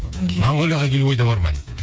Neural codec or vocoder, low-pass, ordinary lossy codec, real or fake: codec, 16 kHz, 4 kbps, FreqCodec, smaller model; none; none; fake